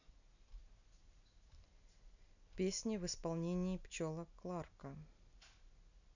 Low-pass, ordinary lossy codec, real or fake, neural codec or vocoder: 7.2 kHz; none; real; none